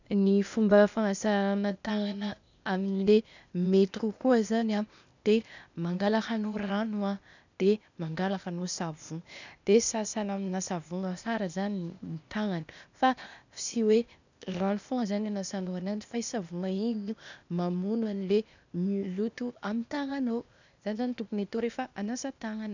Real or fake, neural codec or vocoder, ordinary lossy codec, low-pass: fake; codec, 16 kHz, 0.8 kbps, ZipCodec; none; 7.2 kHz